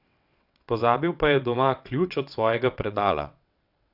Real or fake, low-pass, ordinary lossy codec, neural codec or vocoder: fake; 5.4 kHz; none; vocoder, 22.05 kHz, 80 mel bands, WaveNeXt